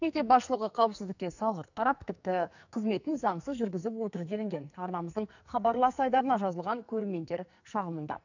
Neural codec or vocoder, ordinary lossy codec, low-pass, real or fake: codec, 44.1 kHz, 2.6 kbps, SNAC; none; 7.2 kHz; fake